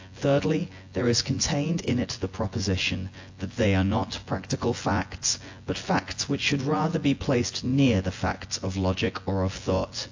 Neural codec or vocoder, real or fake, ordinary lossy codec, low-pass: vocoder, 24 kHz, 100 mel bands, Vocos; fake; AAC, 48 kbps; 7.2 kHz